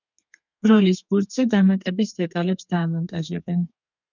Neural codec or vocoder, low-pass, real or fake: codec, 32 kHz, 1.9 kbps, SNAC; 7.2 kHz; fake